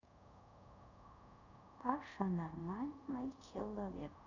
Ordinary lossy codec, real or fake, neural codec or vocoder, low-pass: none; fake; codec, 24 kHz, 0.5 kbps, DualCodec; 7.2 kHz